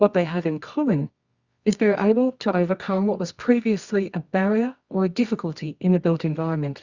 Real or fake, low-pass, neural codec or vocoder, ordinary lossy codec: fake; 7.2 kHz; codec, 24 kHz, 0.9 kbps, WavTokenizer, medium music audio release; Opus, 64 kbps